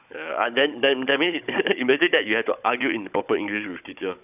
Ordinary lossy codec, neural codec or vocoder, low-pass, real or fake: none; codec, 16 kHz, 16 kbps, FunCodec, trained on Chinese and English, 50 frames a second; 3.6 kHz; fake